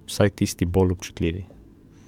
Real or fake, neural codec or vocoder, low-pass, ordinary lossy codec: fake; codec, 44.1 kHz, 7.8 kbps, DAC; 19.8 kHz; MP3, 96 kbps